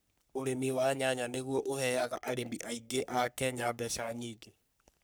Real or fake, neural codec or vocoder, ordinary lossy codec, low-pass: fake; codec, 44.1 kHz, 3.4 kbps, Pupu-Codec; none; none